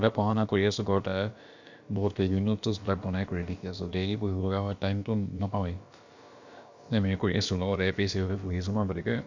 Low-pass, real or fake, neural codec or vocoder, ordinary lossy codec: 7.2 kHz; fake; codec, 16 kHz, about 1 kbps, DyCAST, with the encoder's durations; Opus, 64 kbps